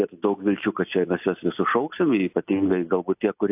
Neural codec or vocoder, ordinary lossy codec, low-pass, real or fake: none; AAC, 32 kbps; 3.6 kHz; real